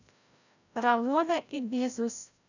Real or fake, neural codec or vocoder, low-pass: fake; codec, 16 kHz, 0.5 kbps, FreqCodec, larger model; 7.2 kHz